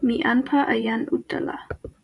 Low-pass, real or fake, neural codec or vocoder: 10.8 kHz; fake; vocoder, 24 kHz, 100 mel bands, Vocos